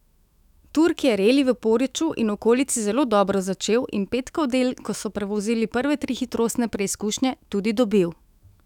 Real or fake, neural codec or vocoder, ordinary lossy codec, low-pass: fake; autoencoder, 48 kHz, 128 numbers a frame, DAC-VAE, trained on Japanese speech; none; 19.8 kHz